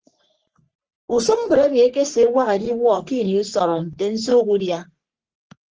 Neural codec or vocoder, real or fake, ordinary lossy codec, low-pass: codec, 44.1 kHz, 3.4 kbps, Pupu-Codec; fake; Opus, 16 kbps; 7.2 kHz